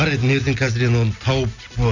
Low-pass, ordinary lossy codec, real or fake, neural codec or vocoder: 7.2 kHz; none; real; none